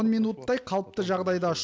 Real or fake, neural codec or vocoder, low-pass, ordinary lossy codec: real; none; none; none